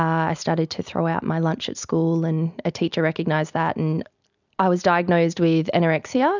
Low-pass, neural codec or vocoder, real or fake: 7.2 kHz; none; real